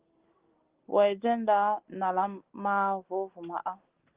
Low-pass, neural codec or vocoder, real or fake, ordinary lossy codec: 3.6 kHz; none; real; Opus, 16 kbps